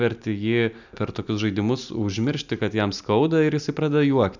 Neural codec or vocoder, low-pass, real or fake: none; 7.2 kHz; real